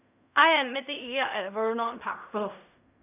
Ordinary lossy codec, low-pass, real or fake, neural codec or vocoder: none; 3.6 kHz; fake; codec, 16 kHz in and 24 kHz out, 0.4 kbps, LongCat-Audio-Codec, fine tuned four codebook decoder